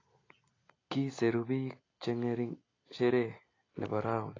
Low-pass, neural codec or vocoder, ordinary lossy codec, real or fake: 7.2 kHz; none; AAC, 32 kbps; real